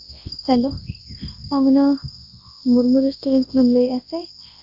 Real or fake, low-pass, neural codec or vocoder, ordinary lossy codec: fake; 5.4 kHz; codec, 24 kHz, 0.9 kbps, WavTokenizer, large speech release; Opus, 24 kbps